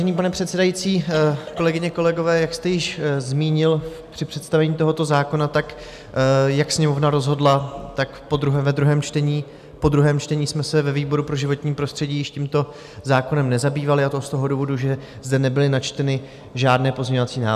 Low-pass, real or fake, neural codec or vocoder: 14.4 kHz; real; none